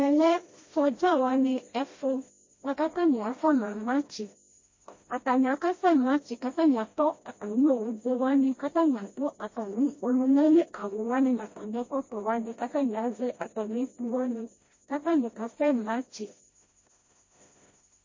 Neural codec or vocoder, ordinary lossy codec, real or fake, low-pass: codec, 16 kHz, 1 kbps, FreqCodec, smaller model; MP3, 32 kbps; fake; 7.2 kHz